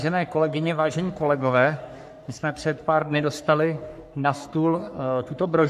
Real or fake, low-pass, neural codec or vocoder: fake; 14.4 kHz; codec, 44.1 kHz, 3.4 kbps, Pupu-Codec